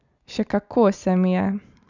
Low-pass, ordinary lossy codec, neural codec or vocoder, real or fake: 7.2 kHz; none; none; real